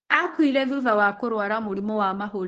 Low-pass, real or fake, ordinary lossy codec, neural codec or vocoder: 10.8 kHz; fake; Opus, 16 kbps; codec, 24 kHz, 0.9 kbps, WavTokenizer, medium speech release version 1